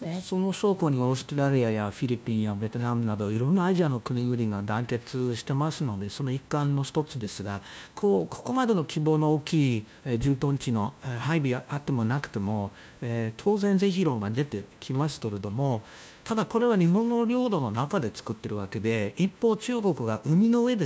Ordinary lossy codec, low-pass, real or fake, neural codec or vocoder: none; none; fake; codec, 16 kHz, 1 kbps, FunCodec, trained on LibriTTS, 50 frames a second